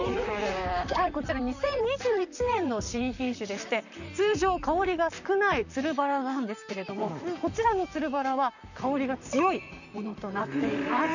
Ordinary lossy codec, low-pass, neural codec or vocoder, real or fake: none; 7.2 kHz; vocoder, 44.1 kHz, 128 mel bands, Pupu-Vocoder; fake